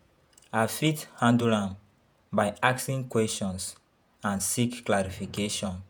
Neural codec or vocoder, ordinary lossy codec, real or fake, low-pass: vocoder, 48 kHz, 128 mel bands, Vocos; none; fake; none